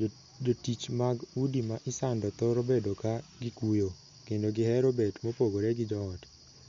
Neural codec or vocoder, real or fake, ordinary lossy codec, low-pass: none; real; MP3, 48 kbps; 7.2 kHz